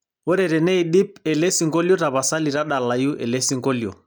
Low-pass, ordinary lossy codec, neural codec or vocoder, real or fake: none; none; none; real